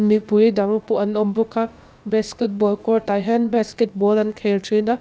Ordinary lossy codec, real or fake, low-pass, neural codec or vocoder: none; fake; none; codec, 16 kHz, about 1 kbps, DyCAST, with the encoder's durations